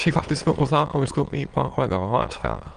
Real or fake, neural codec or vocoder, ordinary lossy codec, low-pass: fake; autoencoder, 22.05 kHz, a latent of 192 numbers a frame, VITS, trained on many speakers; Opus, 32 kbps; 9.9 kHz